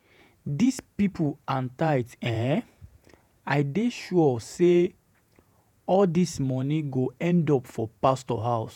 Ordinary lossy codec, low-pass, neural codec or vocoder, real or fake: none; 19.8 kHz; vocoder, 48 kHz, 128 mel bands, Vocos; fake